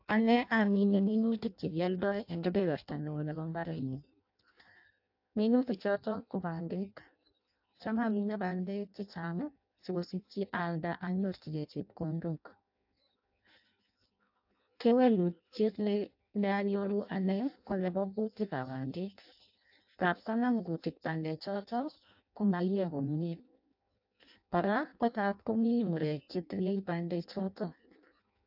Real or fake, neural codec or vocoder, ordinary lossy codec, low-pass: fake; codec, 16 kHz in and 24 kHz out, 0.6 kbps, FireRedTTS-2 codec; AAC, 48 kbps; 5.4 kHz